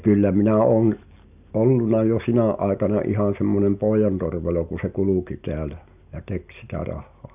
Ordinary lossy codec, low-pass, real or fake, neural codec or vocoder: none; 3.6 kHz; real; none